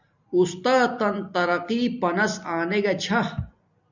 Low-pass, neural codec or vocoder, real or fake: 7.2 kHz; none; real